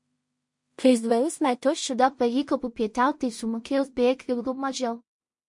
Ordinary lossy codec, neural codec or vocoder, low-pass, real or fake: MP3, 48 kbps; codec, 16 kHz in and 24 kHz out, 0.4 kbps, LongCat-Audio-Codec, two codebook decoder; 10.8 kHz; fake